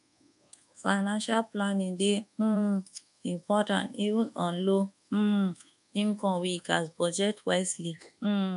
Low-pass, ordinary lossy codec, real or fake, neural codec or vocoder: 10.8 kHz; none; fake; codec, 24 kHz, 1.2 kbps, DualCodec